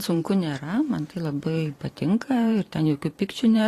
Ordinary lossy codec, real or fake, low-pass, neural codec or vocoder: AAC, 48 kbps; fake; 14.4 kHz; vocoder, 44.1 kHz, 128 mel bands every 512 samples, BigVGAN v2